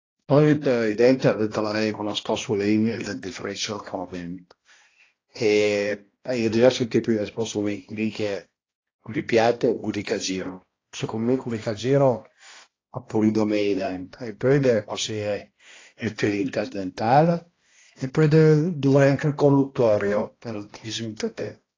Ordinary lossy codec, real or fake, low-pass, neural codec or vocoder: AAC, 32 kbps; fake; 7.2 kHz; codec, 16 kHz, 1 kbps, X-Codec, HuBERT features, trained on balanced general audio